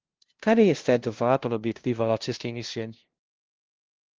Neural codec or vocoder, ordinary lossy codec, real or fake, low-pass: codec, 16 kHz, 0.5 kbps, FunCodec, trained on LibriTTS, 25 frames a second; Opus, 16 kbps; fake; 7.2 kHz